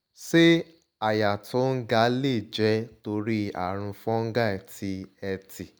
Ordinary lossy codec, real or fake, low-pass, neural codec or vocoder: none; real; none; none